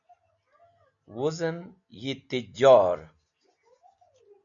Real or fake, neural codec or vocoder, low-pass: real; none; 7.2 kHz